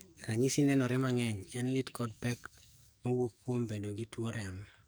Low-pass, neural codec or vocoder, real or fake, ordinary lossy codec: none; codec, 44.1 kHz, 2.6 kbps, SNAC; fake; none